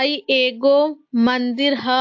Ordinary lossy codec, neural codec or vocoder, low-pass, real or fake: AAC, 48 kbps; none; 7.2 kHz; real